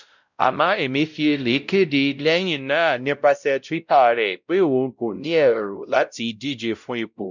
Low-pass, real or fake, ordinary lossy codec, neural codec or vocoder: 7.2 kHz; fake; none; codec, 16 kHz, 0.5 kbps, X-Codec, WavLM features, trained on Multilingual LibriSpeech